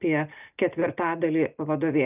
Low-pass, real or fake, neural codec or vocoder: 3.6 kHz; real; none